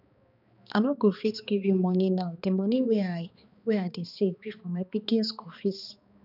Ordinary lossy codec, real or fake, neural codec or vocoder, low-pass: none; fake; codec, 16 kHz, 2 kbps, X-Codec, HuBERT features, trained on balanced general audio; 5.4 kHz